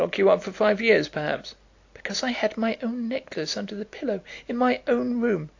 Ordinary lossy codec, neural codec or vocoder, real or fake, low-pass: AAC, 48 kbps; none; real; 7.2 kHz